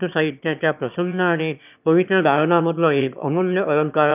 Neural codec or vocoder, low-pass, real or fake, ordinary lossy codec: autoencoder, 22.05 kHz, a latent of 192 numbers a frame, VITS, trained on one speaker; 3.6 kHz; fake; none